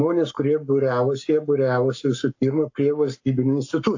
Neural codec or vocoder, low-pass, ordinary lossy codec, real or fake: codec, 44.1 kHz, 7.8 kbps, Pupu-Codec; 7.2 kHz; AAC, 48 kbps; fake